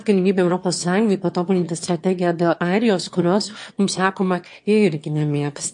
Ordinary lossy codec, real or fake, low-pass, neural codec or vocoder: MP3, 48 kbps; fake; 9.9 kHz; autoencoder, 22.05 kHz, a latent of 192 numbers a frame, VITS, trained on one speaker